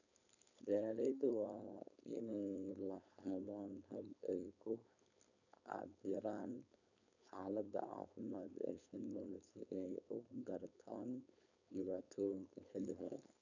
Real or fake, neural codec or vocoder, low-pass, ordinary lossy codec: fake; codec, 16 kHz, 4.8 kbps, FACodec; 7.2 kHz; none